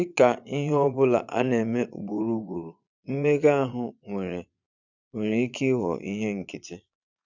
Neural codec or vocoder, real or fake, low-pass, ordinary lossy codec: vocoder, 22.05 kHz, 80 mel bands, Vocos; fake; 7.2 kHz; none